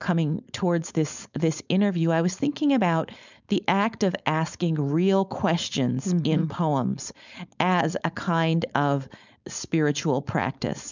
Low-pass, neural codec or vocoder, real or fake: 7.2 kHz; codec, 16 kHz, 4.8 kbps, FACodec; fake